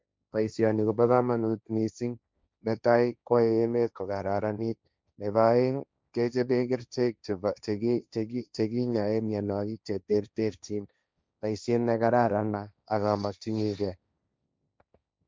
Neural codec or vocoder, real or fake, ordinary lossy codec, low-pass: codec, 16 kHz, 1.1 kbps, Voila-Tokenizer; fake; none; none